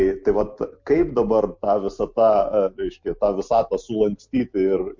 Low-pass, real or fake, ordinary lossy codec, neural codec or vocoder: 7.2 kHz; real; MP3, 48 kbps; none